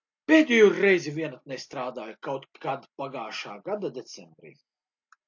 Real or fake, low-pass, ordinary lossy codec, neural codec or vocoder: real; 7.2 kHz; AAC, 48 kbps; none